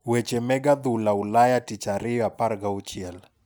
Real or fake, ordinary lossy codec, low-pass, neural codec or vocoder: real; none; none; none